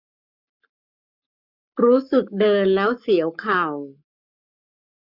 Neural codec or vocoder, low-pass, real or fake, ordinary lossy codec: codec, 44.1 kHz, 7.8 kbps, Pupu-Codec; 5.4 kHz; fake; none